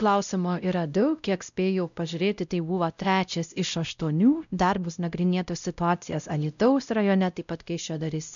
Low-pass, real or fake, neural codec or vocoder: 7.2 kHz; fake; codec, 16 kHz, 0.5 kbps, X-Codec, WavLM features, trained on Multilingual LibriSpeech